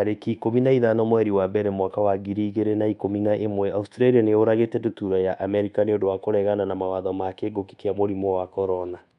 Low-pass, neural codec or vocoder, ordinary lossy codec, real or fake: 10.8 kHz; codec, 24 kHz, 1.2 kbps, DualCodec; none; fake